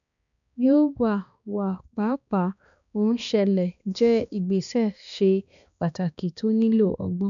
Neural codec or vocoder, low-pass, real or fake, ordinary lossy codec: codec, 16 kHz, 2 kbps, X-Codec, HuBERT features, trained on balanced general audio; 7.2 kHz; fake; none